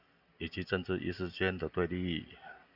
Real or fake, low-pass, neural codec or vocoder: real; 5.4 kHz; none